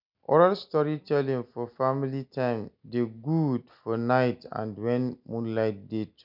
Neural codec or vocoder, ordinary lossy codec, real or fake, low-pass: none; none; real; 5.4 kHz